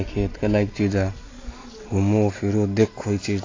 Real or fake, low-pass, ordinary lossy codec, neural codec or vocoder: real; 7.2 kHz; AAC, 32 kbps; none